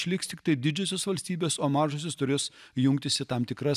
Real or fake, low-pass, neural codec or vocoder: real; 14.4 kHz; none